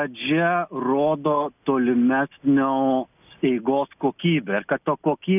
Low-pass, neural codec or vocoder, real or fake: 3.6 kHz; none; real